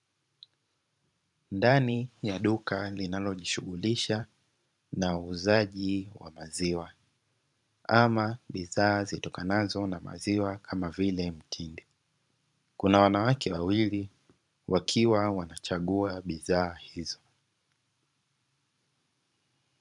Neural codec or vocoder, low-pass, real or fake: vocoder, 44.1 kHz, 128 mel bands every 512 samples, BigVGAN v2; 10.8 kHz; fake